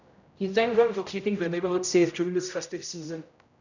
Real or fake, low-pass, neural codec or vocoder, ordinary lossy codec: fake; 7.2 kHz; codec, 16 kHz, 0.5 kbps, X-Codec, HuBERT features, trained on general audio; none